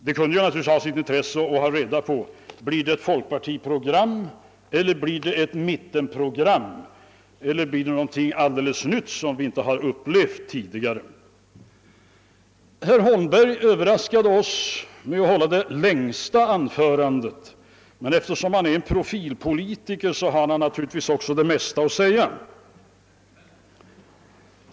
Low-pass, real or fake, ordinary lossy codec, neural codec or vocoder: none; real; none; none